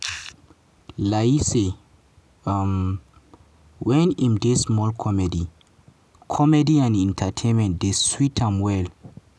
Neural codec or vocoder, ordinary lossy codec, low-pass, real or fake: none; none; none; real